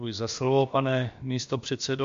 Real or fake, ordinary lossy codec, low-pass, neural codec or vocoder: fake; MP3, 48 kbps; 7.2 kHz; codec, 16 kHz, about 1 kbps, DyCAST, with the encoder's durations